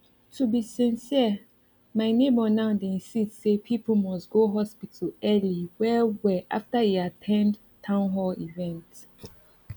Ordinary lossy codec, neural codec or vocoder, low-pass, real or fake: none; none; 19.8 kHz; real